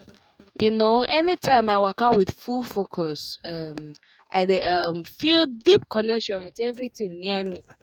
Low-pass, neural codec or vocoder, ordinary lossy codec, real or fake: 19.8 kHz; codec, 44.1 kHz, 2.6 kbps, DAC; none; fake